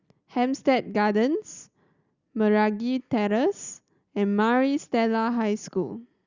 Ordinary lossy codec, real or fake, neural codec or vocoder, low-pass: Opus, 64 kbps; real; none; 7.2 kHz